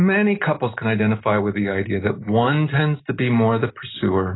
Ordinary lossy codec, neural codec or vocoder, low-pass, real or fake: AAC, 16 kbps; none; 7.2 kHz; real